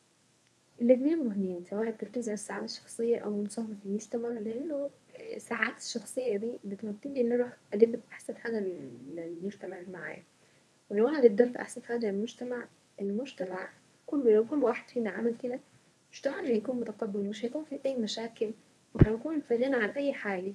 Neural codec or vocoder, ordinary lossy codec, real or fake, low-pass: codec, 24 kHz, 0.9 kbps, WavTokenizer, medium speech release version 1; none; fake; none